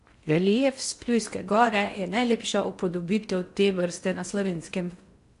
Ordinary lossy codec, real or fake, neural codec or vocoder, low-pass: AAC, 64 kbps; fake; codec, 16 kHz in and 24 kHz out, 0.6 kbps, FocalCodec, streaming, 4096 codes; 10.8 kHz